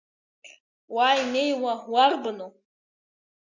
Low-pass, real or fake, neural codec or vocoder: 7.2 kHz; real; none